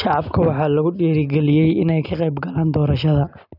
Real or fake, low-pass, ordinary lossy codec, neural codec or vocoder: real; 5.4 kHz; none; none